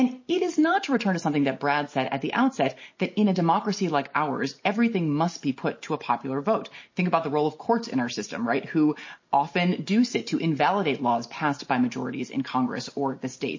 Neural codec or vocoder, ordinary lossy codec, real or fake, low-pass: none; MP3, 32 kbps; real; 7.2 kHz